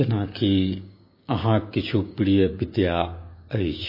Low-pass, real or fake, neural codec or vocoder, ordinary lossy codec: 5.4 kHz; fake; codec, 16 kHz, 6 kbps, DAC; MP3, 24 kbps